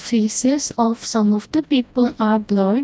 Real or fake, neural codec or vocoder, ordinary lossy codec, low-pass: fake; codec, 16 kHz, 1 kbps, FreqCodec, smaller model; none; none